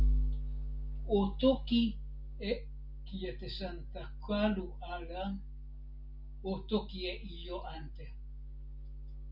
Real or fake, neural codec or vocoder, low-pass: real; none; 5.4 kHz